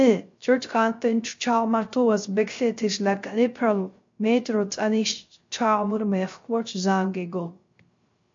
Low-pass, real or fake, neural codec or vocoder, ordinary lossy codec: 7.2 kHz; fake; codec, 16 kHz, 0.3 kbps, FocalCodec; MP3, 48 kbps